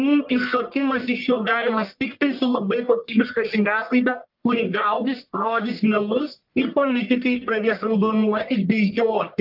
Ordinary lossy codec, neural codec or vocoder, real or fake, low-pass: Opus, 24 kbps; codec, 44.1 kHz, 1.7 kbps, Pupu-Codec; fake; 5.4 kHz